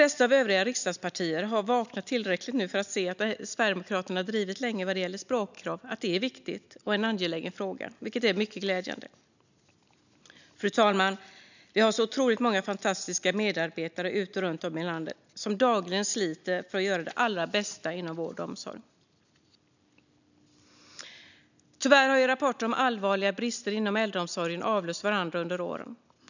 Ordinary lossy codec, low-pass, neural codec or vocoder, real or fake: none; 7.2 kHz; none; real